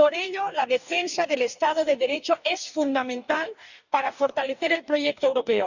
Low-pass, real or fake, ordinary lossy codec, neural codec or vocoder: 7.2 kHz; fake; none; codec, 44.1 kHz, 2.6 kbps, DAC